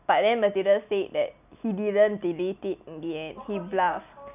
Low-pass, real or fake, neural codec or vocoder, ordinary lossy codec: 3.6 kHz; real; none; none